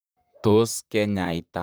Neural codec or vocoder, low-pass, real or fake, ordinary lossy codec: vocoder, 44.1 kHz, 128 mel bands, Pupu-Vocoder; none; fake; none